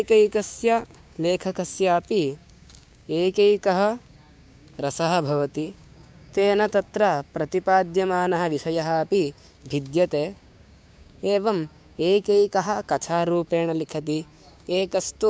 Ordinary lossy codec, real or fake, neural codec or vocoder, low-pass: none; fake; codec, 16 kHz, 6 kbps, DAC; none